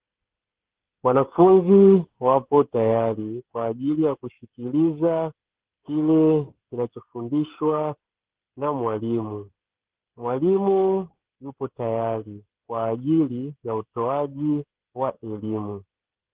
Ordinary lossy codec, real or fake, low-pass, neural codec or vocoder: Opus, 16 kbps; fake; 3.6 kHz; codec, 16 kHz, 16 kbps, FreqCodec, smaller model